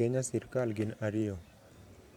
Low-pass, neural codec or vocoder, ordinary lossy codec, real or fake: 19.8 kHz; vocoder, 44.1 kHz, 128 mel bands every 512 samples, BigVGAN v2; none; fake